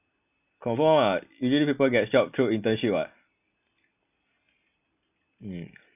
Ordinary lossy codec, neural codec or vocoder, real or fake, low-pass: none; none; real; 3.6 kHz